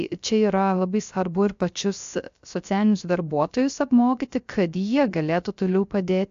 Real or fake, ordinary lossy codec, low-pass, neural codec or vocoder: fake; AAC, 64 kbps; 7.2 kHz; codec, 16 kHz, 0.3 kbps, FocalCodec